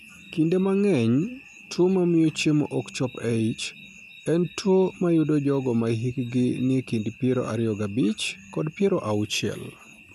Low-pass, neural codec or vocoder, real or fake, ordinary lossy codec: 14.4 kHz; none; real; none